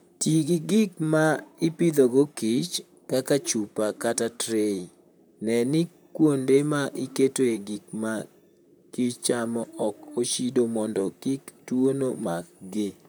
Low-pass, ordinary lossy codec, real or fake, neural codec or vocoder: none; none; fake; vocoder, 44.1 kHz, 128 mel bands, Pupu-Vocoder